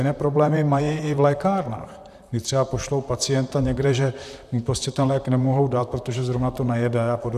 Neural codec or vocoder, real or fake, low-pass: vocoder, 44.1 kHz, 128 mel bands, Pupu-Vocoder; fake; 14.4 kHz